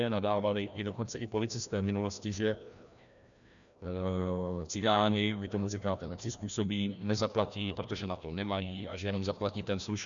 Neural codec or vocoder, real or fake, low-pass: codec, 16 kHz, 1 kbps, FreqCodec, larger model; fake; 7.2 kHz